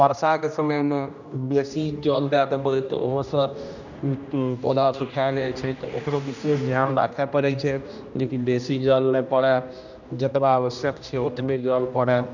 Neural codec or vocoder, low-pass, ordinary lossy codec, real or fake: codec, 16 kHz, 1 kbps, X-Codec, HuBERT features, trained on general audio; 7.2 kHz; none; fake